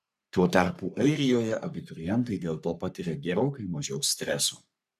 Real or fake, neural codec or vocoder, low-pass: fake; codec, 44.1 kHz, 3.4 kbps, Pupu-Codec; 14.4 kHz